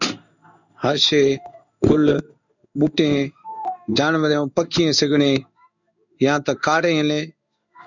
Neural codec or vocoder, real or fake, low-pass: codec, 16 kHz in and 24 kHz out, 1 kbps, XY-Tokenizer; fake; 7.2 kHz